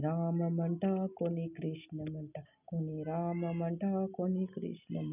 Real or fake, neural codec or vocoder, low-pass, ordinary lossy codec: real; none; 3.6 kHz; none